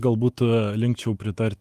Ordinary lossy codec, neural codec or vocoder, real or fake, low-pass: Opus, 32 kbps; autoencoder, 48 kHz, 128 numbers a frame, DAC-VAE, trained on Japanese speech; fake; 14.4 kHz